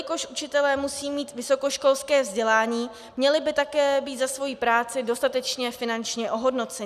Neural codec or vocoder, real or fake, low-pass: none; real; 14.4 kHz